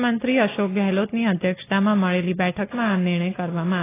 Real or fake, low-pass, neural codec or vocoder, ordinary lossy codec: real; 3.6 kHz; none; AAC, 16 kbps